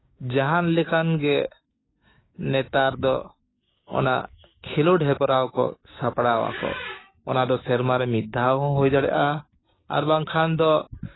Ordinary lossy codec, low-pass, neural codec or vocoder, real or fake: AAC, 16 kbps; 7.2 kHz; codec, 16 kHz, 6 kbps, DAC; fake